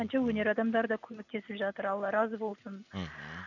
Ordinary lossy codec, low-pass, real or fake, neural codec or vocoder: none; 7.2 kHz; real; none